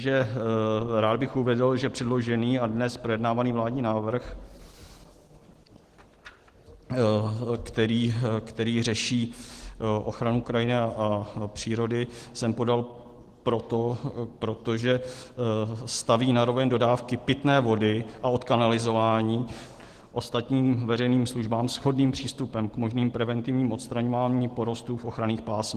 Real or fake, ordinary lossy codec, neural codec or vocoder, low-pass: real; Opus, 16 kbps; none; 14.4 kHz